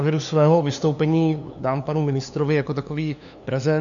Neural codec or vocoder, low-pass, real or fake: codec, 16 kHz, 2 kbps, FunCodec, trained on LibriTTS, 25 frames a second; 7.2 kHz; fake